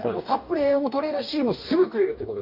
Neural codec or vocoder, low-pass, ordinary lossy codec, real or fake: codec, 44.1 kHz, 2.6 kbps, DAC; 5.4 kHz; none; fake